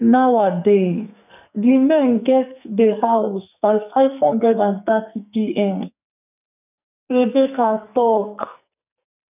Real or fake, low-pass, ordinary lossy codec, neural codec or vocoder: fake; 3.6 kHz; none; codec, 44.1 kHz, 2.6 kbps, SNAC